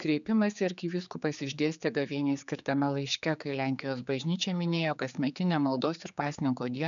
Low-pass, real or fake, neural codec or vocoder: 7.2 kHz; fake; codec, 16 kHz, 4 kbps, X-Codec, HuBERT features, trained on general audio